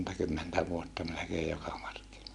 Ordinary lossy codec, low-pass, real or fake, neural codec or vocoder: none; 10.8 kHz; real; none